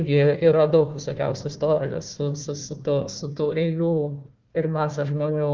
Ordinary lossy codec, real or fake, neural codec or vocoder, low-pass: Opus, 24 kbps; fake; codec, 16 kHz, 1 kbps, FunCodec, trained on Chinese and English, 50 frames a second; 7.2 kHz